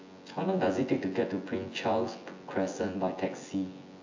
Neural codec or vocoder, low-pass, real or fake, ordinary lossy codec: vocoder, 24 kHz, 100 mel bands, Vocos; 7.2 kHz; fake; AAC, 48 kbps